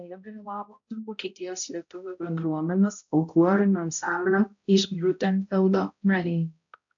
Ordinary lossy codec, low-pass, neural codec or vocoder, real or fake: AAC, 64 kbps; 7.2 kHz; codec, 16 kHz, 0.5 kbps, X-Codec, HuBERT features, trained on balanced general audio; fake